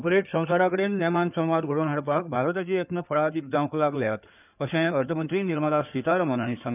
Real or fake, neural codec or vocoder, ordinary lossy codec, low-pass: fake; codec, 16 kHz in and 24 kHz out, 2.2 kbps, FireRedTTS-2 codec; none; 3.6 kHz